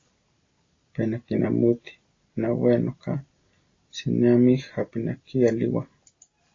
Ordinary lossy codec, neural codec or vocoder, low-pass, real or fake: AAC, 32 kbps; none; 7.2 kHz; real